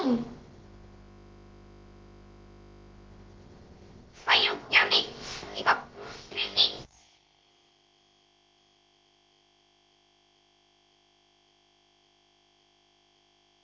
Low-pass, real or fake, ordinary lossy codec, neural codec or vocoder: 7.2 kHz; fake; Opus, 16 kbps; codec, 16 kHz, about 1 kbps, DyCAST, with the encoder's durations